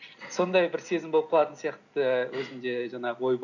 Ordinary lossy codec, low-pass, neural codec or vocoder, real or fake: none; 7.2 kHz; none; real